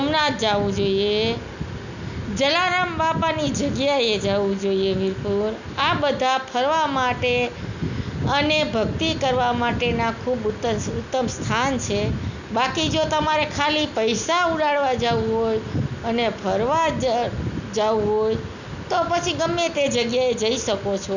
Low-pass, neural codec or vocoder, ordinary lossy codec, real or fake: 7.2 kHz; none; none; real